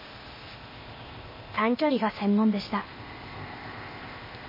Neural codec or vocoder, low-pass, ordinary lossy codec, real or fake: codec, 16 kHz, 0.8 kbps, ZipCodec; 5.4 kHz; MP3, 24 kbps; fake